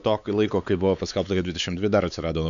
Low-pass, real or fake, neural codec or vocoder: 7.2 kHz; fake; codec, 16 kHz, 4 kbps, X-Codec, WavLM features, trained on Multilingual LibriSpeech